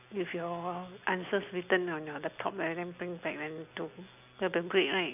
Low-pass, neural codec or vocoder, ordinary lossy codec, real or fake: 3.6 kHz; none; none; real